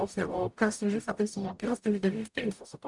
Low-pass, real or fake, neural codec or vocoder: 10.8 kHz; fake; codec, 44.1 kHz, 0.9 kbps, DAC